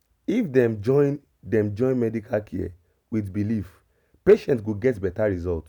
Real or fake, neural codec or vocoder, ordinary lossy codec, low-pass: real; none; none; 19.8 kHz